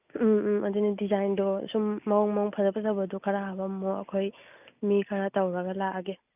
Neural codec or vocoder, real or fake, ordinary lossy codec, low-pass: none; real; none; 3.6 kHz